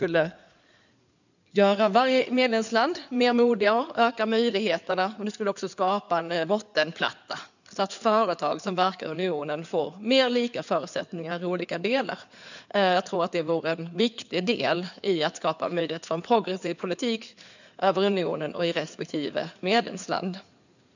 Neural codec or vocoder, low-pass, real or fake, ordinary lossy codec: codec, 16 kHz in and 24 kHz out, 2.2 kbps, FireRedTTS-2 codec; 7.2 kHz; fake; none